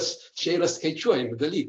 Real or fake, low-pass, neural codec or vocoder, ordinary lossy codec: real; 9.9 kHz; none; AAC, 48 kbps